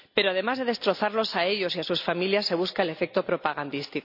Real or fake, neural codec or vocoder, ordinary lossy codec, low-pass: real; none; none; 5.4 kHz